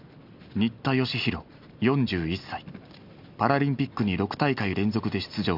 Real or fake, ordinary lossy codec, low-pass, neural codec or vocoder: real; none; 5.4 kHz; none